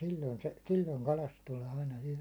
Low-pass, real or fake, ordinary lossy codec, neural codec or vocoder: none; real; none; none